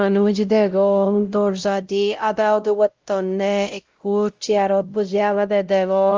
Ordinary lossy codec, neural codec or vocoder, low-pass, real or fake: Opus, 32 kbps; codec, 16 kHz, 0.5 kbps, X-Codec, WavLM features, trained on Multilingual LibriSpeech; 7.2 kHz; fake